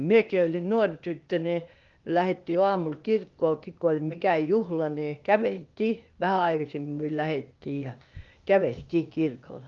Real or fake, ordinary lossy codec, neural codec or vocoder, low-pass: fake; Opus, 32 kbps; codec, 16 kHz, 0.8 kbps, ZipCodec; 7.2 kHz